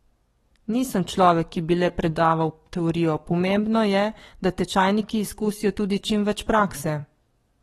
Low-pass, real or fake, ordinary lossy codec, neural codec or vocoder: 19.8 kHz; fake; AAC, 32 kbps; codec, 44.1 kHz, 7.8 kbps, DAC